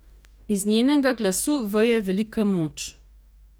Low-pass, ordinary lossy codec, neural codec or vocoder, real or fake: none; none; codec, 44.1 kHz, 2.6 kbps, DAC; fake